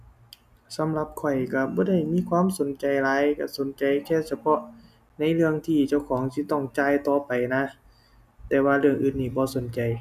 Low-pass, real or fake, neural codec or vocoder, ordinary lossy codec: 14.4 kHz; real; none; none